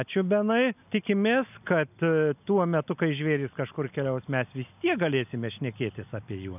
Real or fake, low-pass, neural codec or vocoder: real; 3.6 kHz; none